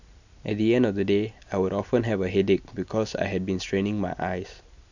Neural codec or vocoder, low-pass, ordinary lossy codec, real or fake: none; 7.2 kHz; none; real